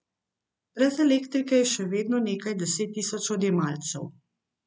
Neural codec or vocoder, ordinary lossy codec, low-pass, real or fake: none; none; none; real